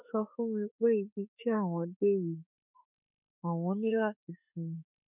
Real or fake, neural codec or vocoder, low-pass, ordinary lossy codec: fake; autoencoder, 48 kHz, 32 numbers a frame, DAC-VAE, trained on Japanese speech; 3.6 kHz; none